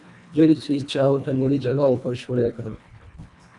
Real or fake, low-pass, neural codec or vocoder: fake; 10.8 kHz; codec, 24 kHz, 1.5 kbps, HILCodec